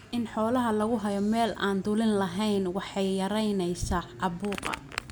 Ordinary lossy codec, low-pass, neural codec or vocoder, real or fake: none; none; none; real